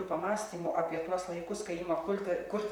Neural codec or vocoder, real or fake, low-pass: vocoder, 44.1 kHz, 128 mel bands, Pupu-Vocoder; fake; 19.8 kHz